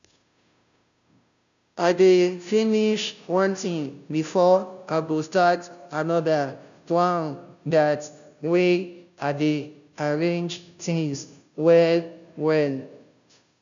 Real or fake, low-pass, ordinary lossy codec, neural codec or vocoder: fake; 7.2 kHz; MP3, 96 kbps; codec, 16 kHz, 0.5 kbps, FunCodec, trained on Chinese and English, 25 frames a second